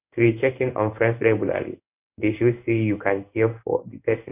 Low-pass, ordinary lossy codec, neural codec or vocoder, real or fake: 3.6 kHz; none; codec, 16 kHz in and 24 kHz out, 1 kbps, XY-Tokenizer; fake